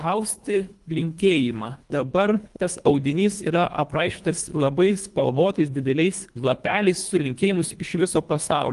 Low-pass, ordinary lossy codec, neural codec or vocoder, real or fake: 10.8 kHz; Opus, 32 kbps; codec, 24 kHz, 1.5 kbps, HILCodec; fake